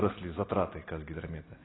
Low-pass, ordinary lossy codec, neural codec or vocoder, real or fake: 7.2 kHz; AAC, 16 kbps; none; real